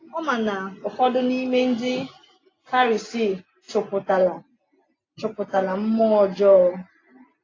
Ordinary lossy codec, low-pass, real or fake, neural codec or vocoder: AAC, 32 kbps; 7.2 kHz; real; none